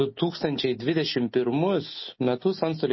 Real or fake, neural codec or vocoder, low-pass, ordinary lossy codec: real; none; 7.2 kHz; MP3, 24 kbps